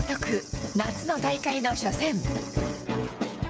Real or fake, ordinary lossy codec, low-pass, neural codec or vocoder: fake; none; none; codec, 16 kHz, 8 kbps, FreqCodec, smaller model